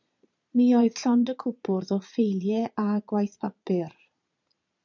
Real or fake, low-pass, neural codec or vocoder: fake; 7.2 kHz; vocoder, 22.05 kHz, 80 mel bands, Vocos